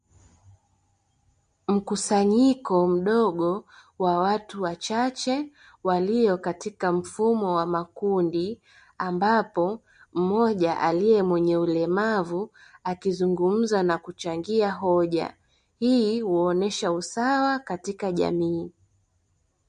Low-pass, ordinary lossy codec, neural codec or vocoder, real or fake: 9.9 kHz; MP3, 48 kbps; none; real